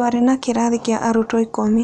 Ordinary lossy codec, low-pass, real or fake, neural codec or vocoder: none; 10.8 kHz; real; none